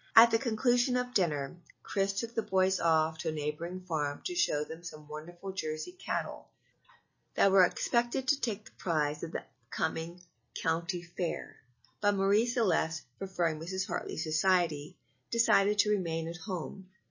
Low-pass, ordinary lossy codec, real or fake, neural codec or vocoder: 7.2 kHz; MP3, 32 kbps; real; none